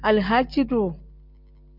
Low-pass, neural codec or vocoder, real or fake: 5.4 kHz; none; real